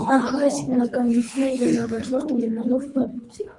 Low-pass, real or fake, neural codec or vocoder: 10.8 kHz; fake; codec, 24 kHz, 3 kbps, HILCodec